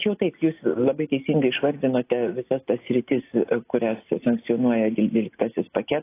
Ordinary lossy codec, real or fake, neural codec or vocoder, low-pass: AAC, 24 kbps; real; none; 3.6 kHz